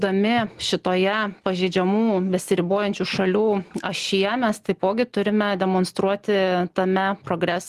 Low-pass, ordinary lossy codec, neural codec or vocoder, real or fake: 14.4 kHz; Opus, 24 kbps; none; real